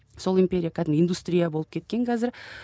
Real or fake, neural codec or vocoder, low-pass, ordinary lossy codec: real; none; none; none